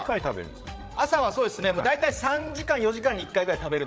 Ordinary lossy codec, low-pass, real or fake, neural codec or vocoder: none; none; fake; codec, 16 kHz, 8 kbps, FreqCodec, larger model